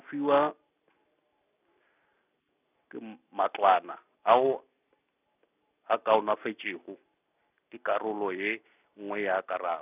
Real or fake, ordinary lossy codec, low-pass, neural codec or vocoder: real; none; 3.6 kHz; none